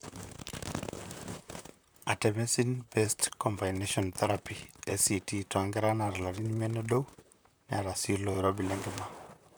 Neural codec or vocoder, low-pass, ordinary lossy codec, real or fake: vocoder, 44.1 kHz, 128 mel bands, Pupu-Vocoder; none; none; fake